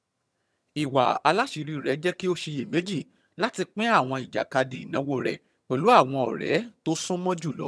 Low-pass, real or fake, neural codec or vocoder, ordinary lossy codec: none; fake; vocoder, 22.05 kHz, 80 mel bands, HiFi-GAN; none